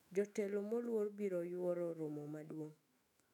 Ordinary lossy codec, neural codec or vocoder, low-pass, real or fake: none; autoencoder, 48 kHz, 128 numbers a frame, DAC-VAE, trained on Japanese speech; 19.8 kHz; fake